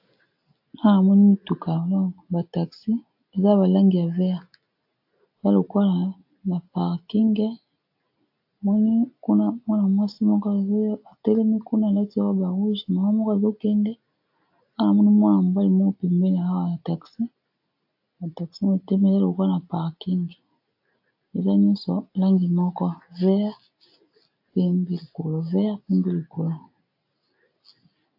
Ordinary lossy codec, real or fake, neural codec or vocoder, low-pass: AAC, 48 kbps; real; none; 5.4 kHz